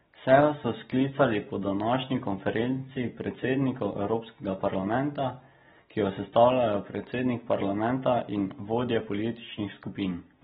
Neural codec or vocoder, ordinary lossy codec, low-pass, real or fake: none; AAC, 16 kbps; 7.2 kHz; real